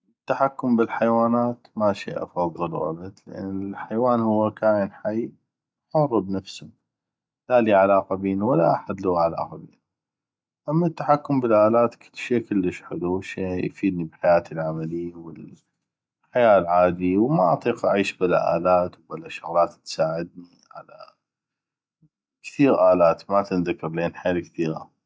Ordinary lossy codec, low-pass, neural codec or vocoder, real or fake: none; none; none; real